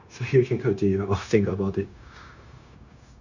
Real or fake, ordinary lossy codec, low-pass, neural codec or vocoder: fake; none; 7.2 kHz; codec, 16 kHz, 0.9 kbps, LongCat-Audio-Codec